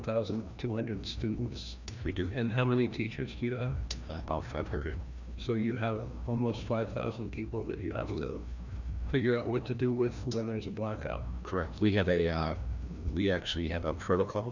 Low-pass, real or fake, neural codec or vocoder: 7.2 kHz; fake; codec, 16 kHz, 1 kbps, FreqCodec, larger model